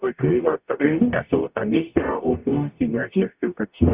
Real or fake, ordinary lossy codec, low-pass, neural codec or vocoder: fake; Opus, 64 kbps; 3.6 kHz; codec, 44.1 kHz, 0.9 kbps, DAC